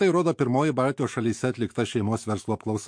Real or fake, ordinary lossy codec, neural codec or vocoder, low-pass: fake; MP3, 48 kbps; autoencoder, 48 kHz, 128 numbers a frame, DAC-VAE, trained on Japanese speech; 9.9 kHz